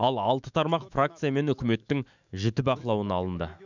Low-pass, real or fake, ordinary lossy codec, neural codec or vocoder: 7.2 kHz; fake; none; autoencoder, 48 kHz, 128 numbers a frame, DAC-VAE, trained on Japanese speech